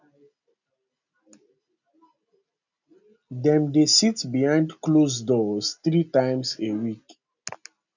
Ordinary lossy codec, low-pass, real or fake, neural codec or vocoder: none; 7.2 kHz; real; none